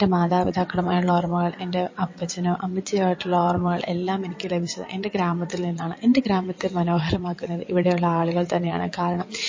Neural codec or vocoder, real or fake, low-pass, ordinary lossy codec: vocoder, 22.05 kHz, 80 mel bands, WaveNeXt; fake; 7.2 kHz; MP3, 32 kbps